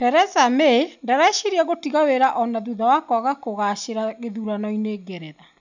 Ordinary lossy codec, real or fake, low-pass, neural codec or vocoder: none; real; 7.2 kHz; none